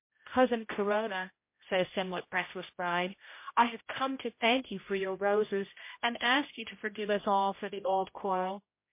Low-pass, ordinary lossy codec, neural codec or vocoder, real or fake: 3.6 kHz; MP3, 24 kbps; codec, 16 kHz, 0.5 kbps, X-Codec, HuBERT features, trained on general audio; fake